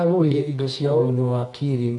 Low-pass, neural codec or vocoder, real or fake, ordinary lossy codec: 10.8 kHz; codec, 24 kHz, 0.9 kbps, WavTokenizer, medium music audio release; fake; none